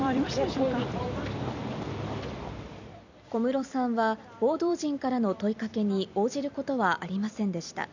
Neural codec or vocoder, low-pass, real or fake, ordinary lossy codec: vocoder, 44.1 kHz, 128 mel bands every 256 samples, BigVGAN v2; 7.2 kHz; fake; none